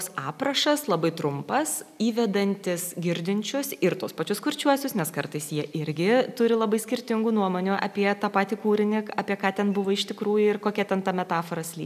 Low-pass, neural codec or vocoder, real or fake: 14.4 kHz; none; real